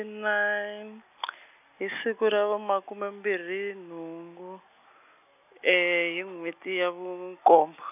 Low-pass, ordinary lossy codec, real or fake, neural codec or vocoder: 3.6 kHz; none; real; none